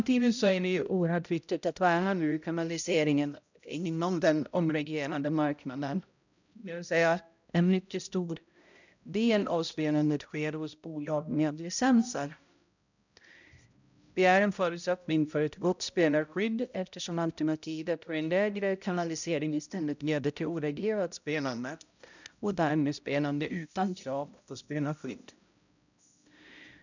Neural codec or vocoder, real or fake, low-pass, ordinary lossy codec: codec, 16 kHz, 0.5 kbps, X-Codec, HuBERT features, trained on balanced general audio; fake; 7.2 kHz; none